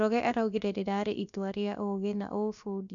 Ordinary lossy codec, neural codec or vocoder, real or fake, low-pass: none; codec, 16 kHz, about 1 kbps, DyCAST, with the encoder's durations; fake; 7.2 kHz